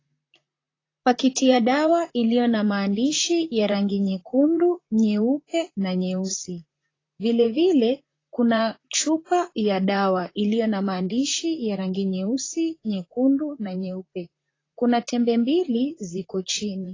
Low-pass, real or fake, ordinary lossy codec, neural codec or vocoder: 7.2 kHz; fake; AAC, 32 kbps; vocoder, 44.1 kHz, 128 mel bands, Pupu-Vocoder